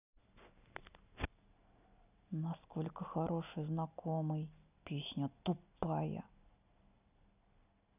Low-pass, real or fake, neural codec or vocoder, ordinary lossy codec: 3.6 kHz; real; none; none